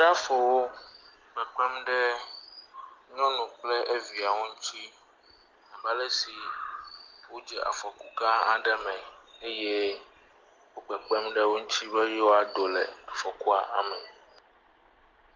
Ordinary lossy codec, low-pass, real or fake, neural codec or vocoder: Opus, 24 kbps; 7.2 kHz; real; none